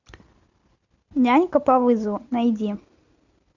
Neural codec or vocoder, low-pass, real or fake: none; 7.2 kHz; real